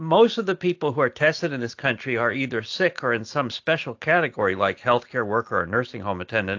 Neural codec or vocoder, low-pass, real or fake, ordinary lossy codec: none; 7.2 kHz; real; AAC, 48 kbps